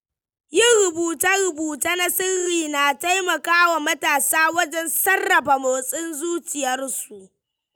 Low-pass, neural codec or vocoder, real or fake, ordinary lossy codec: none; none; real; none